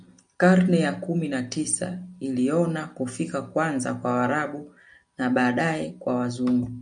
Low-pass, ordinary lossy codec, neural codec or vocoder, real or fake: 9.9 kHz; MP3, 96 kbps; none; real